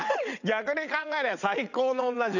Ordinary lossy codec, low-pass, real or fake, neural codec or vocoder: none; 7.2 kHz; fake; vocoder, 22.05 kHz, 80 mel bands, WaveNeXt